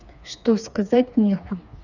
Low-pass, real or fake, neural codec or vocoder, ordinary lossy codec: 7.2 kHz; fake; codec, 16 kHz, 4 kbps, FreqCodec, smaller model; none